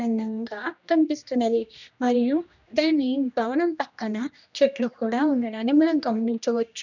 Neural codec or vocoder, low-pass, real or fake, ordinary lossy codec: codec, 16 kHz, 1 kbps, X-Codec, HuBERT features, trained on general audio; 7.2 kHz; fake; none